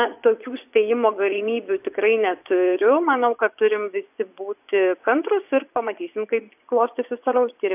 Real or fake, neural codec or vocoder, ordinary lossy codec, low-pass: fake; vocoder, 24 kHz, 100 mel bands, Vocos; AAC, 32 kbps; 3.6 kHz